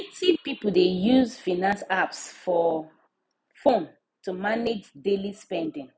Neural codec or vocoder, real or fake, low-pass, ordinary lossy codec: none; real; none; none